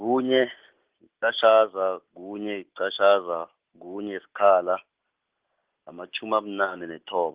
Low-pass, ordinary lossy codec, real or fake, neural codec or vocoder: 3.6 kHz; Opus, 16 kbps; real; none